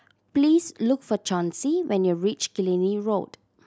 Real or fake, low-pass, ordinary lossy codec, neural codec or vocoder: real; none; none; none